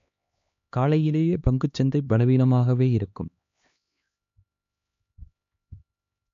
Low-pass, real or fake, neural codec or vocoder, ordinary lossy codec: 7.2 kHz; fake; codec, 16 kHz, 2 kbps, X-Codec, HuBERT features, trained on LibriSpeech; MP3, 48 kbps